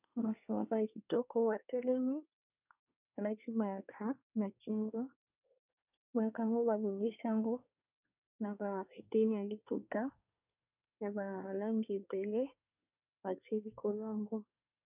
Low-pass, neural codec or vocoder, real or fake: 3.6 kHz; codec, 24 kHz, 1 kbps, SNAC; fake